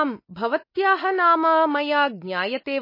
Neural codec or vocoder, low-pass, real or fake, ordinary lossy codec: none; 5.4 kHz; real; MP3, 24 kbps